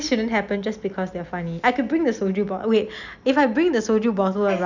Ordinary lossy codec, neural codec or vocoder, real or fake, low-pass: none; none; real; 7.2 kHz